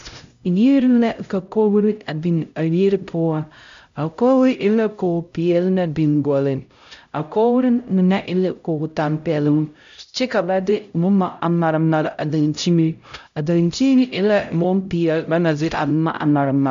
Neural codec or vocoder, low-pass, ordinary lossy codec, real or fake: codec, 16 kHz, 0.5 kbps, X-Codec, HuBERT features, trained on LibriSpeech; 7.2 kHz; MP3, 64 kbps; fake